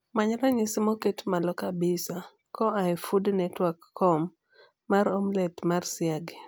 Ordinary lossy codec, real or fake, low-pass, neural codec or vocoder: none; real; none; none